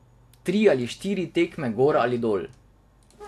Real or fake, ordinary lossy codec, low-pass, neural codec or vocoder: real; AAC, 64 kbps; 14.4 kHz; none